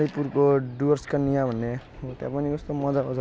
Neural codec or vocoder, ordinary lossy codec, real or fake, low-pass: none; none; real; none